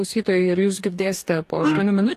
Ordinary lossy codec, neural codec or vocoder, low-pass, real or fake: AAC, 64 kbps; codec, 44.1 kHz, 2.6 kbps, DAC; 14.4 kHz; fake